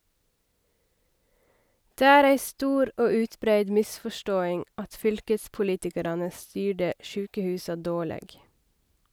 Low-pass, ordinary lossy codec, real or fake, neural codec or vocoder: none; none; real; none